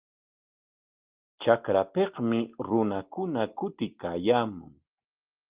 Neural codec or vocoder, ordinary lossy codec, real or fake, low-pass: none; Opus, 32 kbps; real; 3.6 kHz